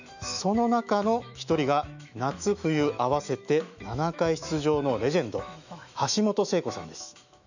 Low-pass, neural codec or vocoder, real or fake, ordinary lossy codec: 7.2 kHz; autoencoder, 48 kHz, 128 numbers a frame, DAC-VAE, trained on Japanese speech; fake; none